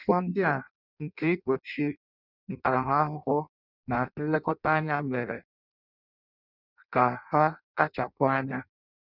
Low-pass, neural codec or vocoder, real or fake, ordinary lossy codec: 5.4 kHz; codec, 16 kHz in and 24 kHz out, 0.6 kbps, FireRedTTS-2 codec; fake; none